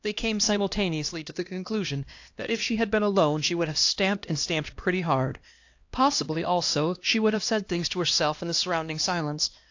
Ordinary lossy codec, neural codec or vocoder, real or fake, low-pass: AAC, 48 kbps; codec, 16 kHz, 1 kbps, X-Codec, HuBERT features, trained on LibriSpeech; fake; 7.2 kHz